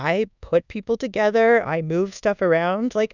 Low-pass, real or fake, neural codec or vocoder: 7.2 kHz; fake; codec, 24 kHz, 1.2 kbps, DualCodec